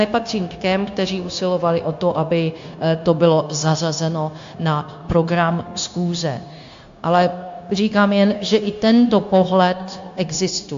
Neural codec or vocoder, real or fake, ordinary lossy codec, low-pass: codec, 16 kHz, 0.9 kbps, LongCat-Audio-Codec; fake; AAC, 64 kbps; 7.2 kHz